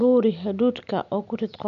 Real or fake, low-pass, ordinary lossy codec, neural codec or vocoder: real; 7.2 kHz; none; none